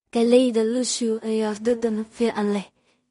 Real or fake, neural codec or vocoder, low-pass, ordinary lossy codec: fake; codec, 16 kHz in and 24 kHz out, 0.4 kbps, LongCat-Audio-Codec, two codebook decoder; 10.8 kHz; MP3, 48 kbps